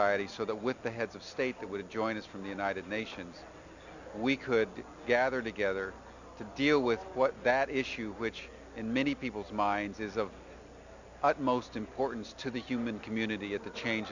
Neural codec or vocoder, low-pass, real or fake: none; 7.2 kHz; real